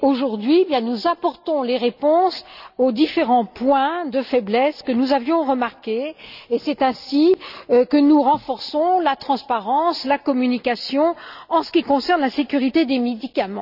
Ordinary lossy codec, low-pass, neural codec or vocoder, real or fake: none; 5.4 kHz; none; real